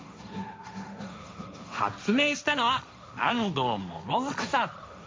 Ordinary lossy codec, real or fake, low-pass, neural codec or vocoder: none; fake; none; codec, 16 kHz, 1.1 kbps, Voila-Tokenizer